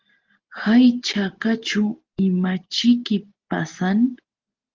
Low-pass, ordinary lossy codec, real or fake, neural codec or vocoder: 7.2 kHz; Opus, 16 kbps; real; none